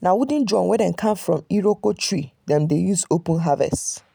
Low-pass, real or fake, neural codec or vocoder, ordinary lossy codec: none; real; none; none